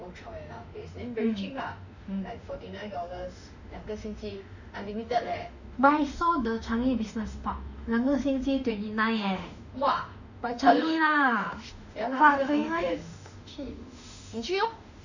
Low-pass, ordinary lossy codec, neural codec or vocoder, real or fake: 7.2 kHz; none; autoencoder, 48 kHz, 32 numbers a frame, DAC-VAE, trained on Japanese speech; fake